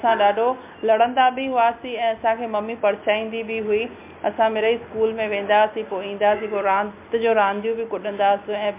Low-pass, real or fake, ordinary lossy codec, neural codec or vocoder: 3.6 kHz; real; MP3, 32 kbps; none